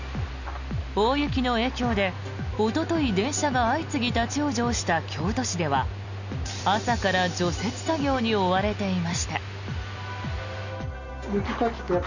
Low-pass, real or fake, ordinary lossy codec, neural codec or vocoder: 7.2 kHz; real; none; none